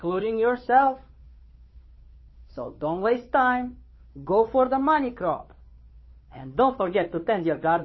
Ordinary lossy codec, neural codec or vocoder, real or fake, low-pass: MP3, 24 kbps; codec, 16 kHz, 2 kbps, FunCodec, trained on Chinese and English, 25 frames a second; fake; 7.2 kHz